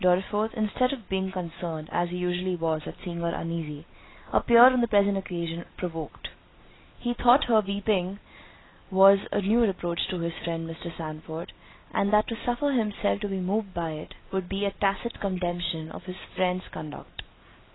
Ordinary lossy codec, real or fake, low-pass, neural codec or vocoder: AAC, 16 kbps; real; 7.2 kHz; none